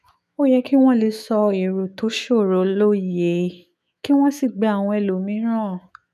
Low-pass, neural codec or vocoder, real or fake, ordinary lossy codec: 14.4 kHz; autoencoder, 48 kHz, 128 numbers a frame, DAC-VAE, trained on Japanese speech; fake; none